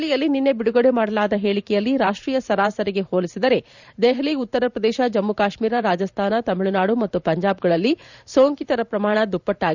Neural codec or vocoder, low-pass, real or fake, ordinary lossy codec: vocoder, 44.1 kHz, 128 mel bands every 512 samples, BigVGAN v2; 7.2 kHz; fake; none